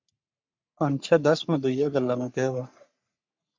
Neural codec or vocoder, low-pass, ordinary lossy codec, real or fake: codec, 44.1 kHz, 3.4 kbps, Pupu-Codec; 7.2 kHz; MP3, 64 kbps; fake